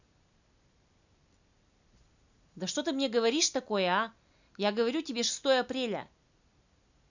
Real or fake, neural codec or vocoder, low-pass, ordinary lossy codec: real; none; 7.2 kHz; none